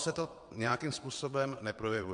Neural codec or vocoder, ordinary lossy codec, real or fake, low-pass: vocoder, 22.05 kHz, 80 mel bands, WaveNeXt; MP3, 64 kbps; fake; 9.9 kHz